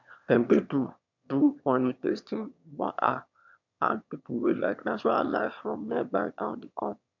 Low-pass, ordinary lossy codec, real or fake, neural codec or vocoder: 7.2 kHz; MP3, 64 kbps; fake; autoencoder, 22.05 kHz, a latent of 192 numbers a frame, VITS, trained on one speaker